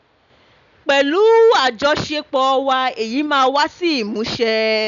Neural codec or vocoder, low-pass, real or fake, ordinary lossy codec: none; 7.2 kHz; real; none